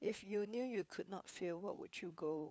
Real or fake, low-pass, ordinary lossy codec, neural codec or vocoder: fake; none; none; codec, 16 kHz, 4 kbps, FunCodec, trained on LibriTTS, 50 frames a second